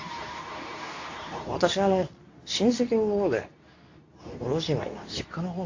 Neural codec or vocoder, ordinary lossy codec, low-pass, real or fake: codec, 24 kHz, 0.9 kbps, WavTokenizer, medium speech release version 2; AAC, 48 kbps; 7.2 kHz; fake